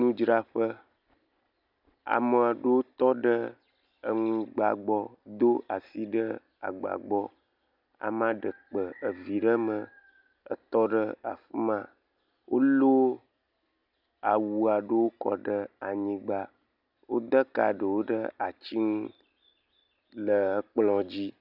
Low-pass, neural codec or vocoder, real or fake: 5.4 kHz; none; real